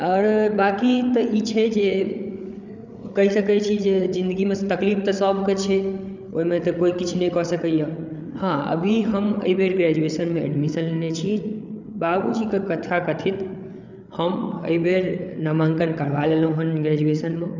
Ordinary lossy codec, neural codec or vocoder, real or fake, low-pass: none; codec, 16 kHz, 8 kbps, FreqCodec, larger model; fake; 7.2 kHz